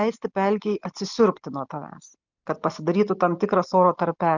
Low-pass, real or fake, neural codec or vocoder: 7.2 kHz; real; none